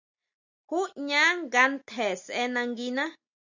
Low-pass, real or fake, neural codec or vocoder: 7.2 kHz; real; none